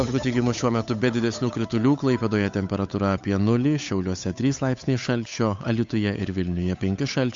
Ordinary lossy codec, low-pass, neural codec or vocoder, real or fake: MP3, 48 kbps; 7.2 kHz; codec, 16 kHz, 8 kbps, FunCodec, trained on Chinese and English, 25 frames a second; fake